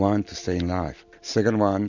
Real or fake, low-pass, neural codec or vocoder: real; 7.2 kHz; none